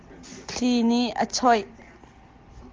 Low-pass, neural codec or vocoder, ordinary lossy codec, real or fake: 7.2 kHz; none; Opus, 24 kbps; real